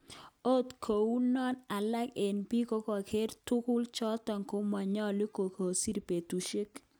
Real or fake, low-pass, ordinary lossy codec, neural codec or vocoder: real; none; none; none